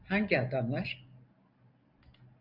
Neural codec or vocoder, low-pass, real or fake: none; 5.4 kHz; real